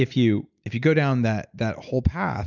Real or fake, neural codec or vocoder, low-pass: real; none; 7.2 kHz